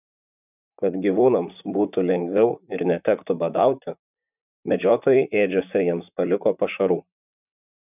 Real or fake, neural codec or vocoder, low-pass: fake; vocoder, 44.1 kHz, 128 mel bands, Pupu-Vocoder; 3.6 kHz